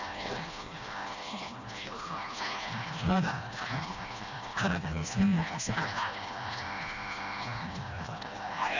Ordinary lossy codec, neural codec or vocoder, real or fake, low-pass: none; codec, 16 kHz, 1 kbps, FreqCodec, smaller model; fake; 7.2 kHz